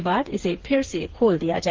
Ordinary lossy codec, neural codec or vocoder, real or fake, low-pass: Opus, 16 kbps; codec, 16 kHz, 4 kbps, FreqCodec, smaller model; fake; 7.2 kHz